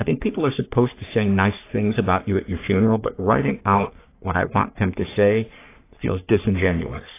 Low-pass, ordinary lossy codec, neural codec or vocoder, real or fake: 3.6 kHz; AAC, 24 kbps; codec, 44.1 kHz, 3.4 kbps, Pupu-Codec; fake